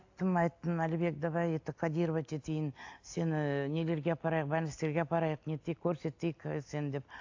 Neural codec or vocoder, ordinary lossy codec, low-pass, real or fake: none; AAC, 48 kbps; 7.2 kHz; real